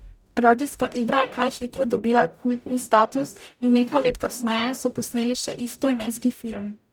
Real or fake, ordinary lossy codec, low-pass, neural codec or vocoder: fake; none; none; codec, 44.1 kHz, 0.9 kbps, DAC